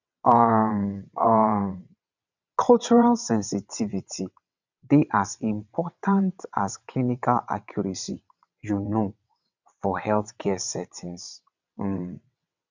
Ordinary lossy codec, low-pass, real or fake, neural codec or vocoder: none; 7.2 kHz; fake; vocoder, 22.05 kHz, 80 mel bands, WaveNeXt